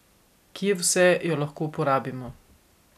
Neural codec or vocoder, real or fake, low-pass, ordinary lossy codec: none; real; 14.4 kHz; none